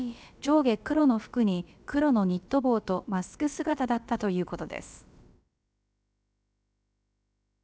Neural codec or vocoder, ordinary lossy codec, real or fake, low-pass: codec, 16 kHz, about 1 kbps, DyCAST, with the encoder's durations; none; fake; none